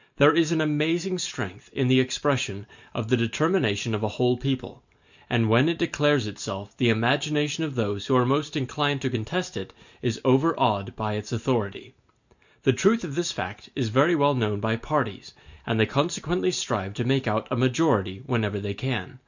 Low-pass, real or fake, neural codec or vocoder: 7.2 kHz; real; none